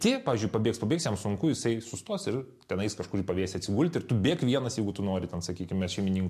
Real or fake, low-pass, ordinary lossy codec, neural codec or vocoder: real; 14.4 kHz; MP3, 64 kbps; none